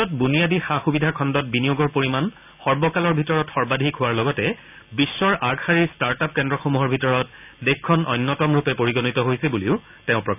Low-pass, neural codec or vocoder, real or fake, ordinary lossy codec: 3.6 kHz; none; real; none